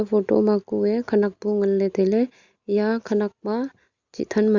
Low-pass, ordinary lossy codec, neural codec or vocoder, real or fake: 7.2 kHz; Opus, 64 kbps; none; real